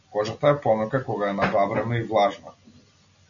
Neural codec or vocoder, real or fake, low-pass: none; real; 7.2 kHz